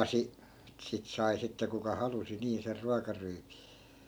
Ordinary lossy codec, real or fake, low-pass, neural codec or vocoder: none; real; none; none